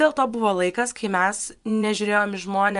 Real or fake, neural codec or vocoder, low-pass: fake; vocoder, 24 kHz, 100 mel bands, Vocos; 10.8 kHz